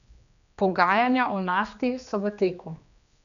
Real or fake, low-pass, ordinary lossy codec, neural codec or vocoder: fake; 7.2 kHz; none; codec, 16 kHz, 2 kbps, X-Codec, HuBERT features, trained on general audio